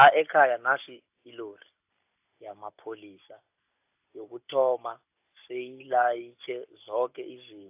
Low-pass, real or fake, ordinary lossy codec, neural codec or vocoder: 3.6 kHz; real; AAC, 32 kbps; none